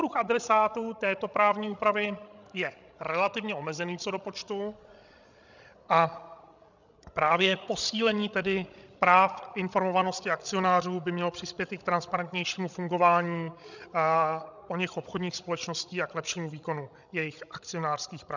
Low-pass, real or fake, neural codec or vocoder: 7.2 kHz; fake; codec, 16 kHz, 16 kbps, FreqCodec, larger model